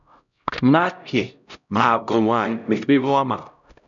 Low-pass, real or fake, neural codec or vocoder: 7.2 kHz; fake; codec, 16 kHz, 0.5 kbps, X-Codec, HuBERT features, trained on LibriSpeech